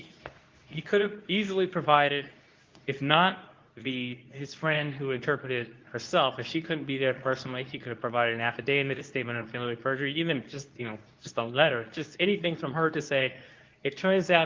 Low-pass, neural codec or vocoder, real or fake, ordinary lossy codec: 7.2 kHz; codec, 24 kHz, 0.9 kbps, WavTokenizer, medium speech release version 2; fake; Opus, 32 kbps